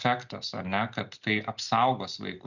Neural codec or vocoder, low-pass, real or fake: none; 7.2 kHz; real